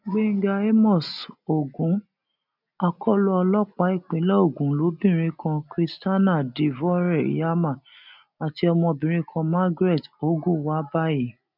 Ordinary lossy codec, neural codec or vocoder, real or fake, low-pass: none; none; real; 5.4 kHz